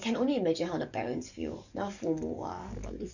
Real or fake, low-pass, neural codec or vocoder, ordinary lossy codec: fake; 7.2 kHz; codec, 44.1 kHz, 7.8 kbps, DAC; none